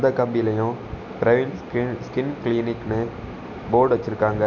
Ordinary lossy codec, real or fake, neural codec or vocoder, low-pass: none; real; none; 7.2 kHz